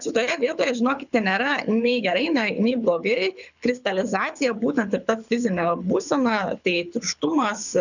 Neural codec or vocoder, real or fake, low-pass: codec, 24 kHz, 6 kbps, HILCodec; fake; 7.2 kHz